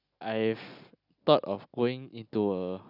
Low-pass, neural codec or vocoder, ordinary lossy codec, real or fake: 5.4 kHz; none; none; real